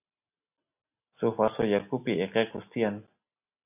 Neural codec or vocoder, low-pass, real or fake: none; 3.6 kHz; real